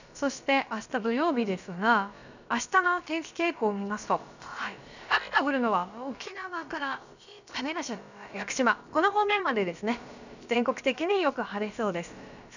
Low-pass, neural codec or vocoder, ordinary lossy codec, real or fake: 7.2 kHz; codec, 16 kHz, about 1 kbps, DyCAST, with the encoder's durations; none; fake